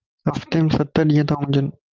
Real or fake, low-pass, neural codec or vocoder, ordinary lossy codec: real; 7.2 kHz; none; Opus, 32 kbps